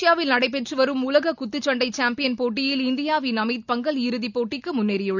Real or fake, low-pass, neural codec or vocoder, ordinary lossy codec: real; 7.2 kHz; none; none